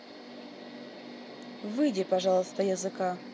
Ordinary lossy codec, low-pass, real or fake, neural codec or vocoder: none; none; real; none